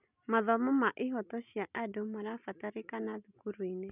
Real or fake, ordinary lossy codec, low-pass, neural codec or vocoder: real; none; 3.6 kHz; none